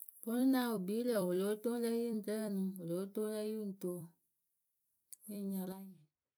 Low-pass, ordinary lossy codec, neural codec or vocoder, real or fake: none; none; vocoder, 44.1 kHz, 128 mel bands every 256 samples, BigVGAN v2; fake